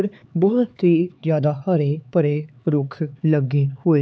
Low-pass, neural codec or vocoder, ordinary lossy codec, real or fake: none; codec, 16 kHz, 4 kbps, X-Codec, HuBERT features, trained on LibriSpeech; none; fake